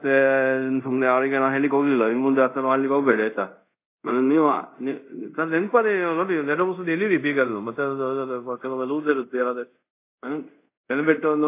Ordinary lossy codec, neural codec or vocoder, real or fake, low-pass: AAC, 24 kbps; codec, 24 kHz, 0.5 kbps, DualCodec; fake; 3.6 kHz